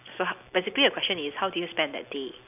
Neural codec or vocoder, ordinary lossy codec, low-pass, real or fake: none; none; 3.6 kHz; real